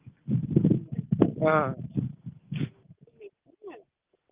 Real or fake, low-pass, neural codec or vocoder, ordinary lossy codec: real; 3.6 kHz; none; Opus, 32 kbps